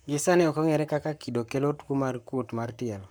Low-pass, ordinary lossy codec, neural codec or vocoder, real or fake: none; none; codec, 44.1 kHz, 7.8 kbps, Pupu-Codec; fake